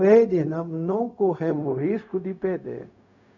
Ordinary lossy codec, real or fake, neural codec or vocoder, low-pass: none; fake; codec, 16 kHz, 0.4 kbps, LongCat-Audio-Codec; 7.2 kHz